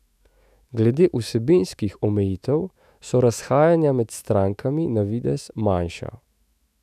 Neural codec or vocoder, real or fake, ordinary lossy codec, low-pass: autoencoder, 48 kHz, 128 numbers a frame, DAC-VAE, trained on Japanese speech; fake; none; 14.4 kHz